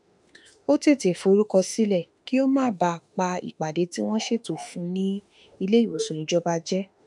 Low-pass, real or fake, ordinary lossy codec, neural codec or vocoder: 10.8 kHz; fake; none; autoencoder, 48 kHz, 32 numbers a frame, DAC-VAE, trained on Japanese speech